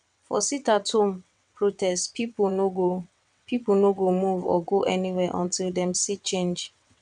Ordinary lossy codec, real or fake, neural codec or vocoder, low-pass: none; fake; vocoder, 22.05 kHz, 80 mel bands, WaveNeXt; 9.9 kHz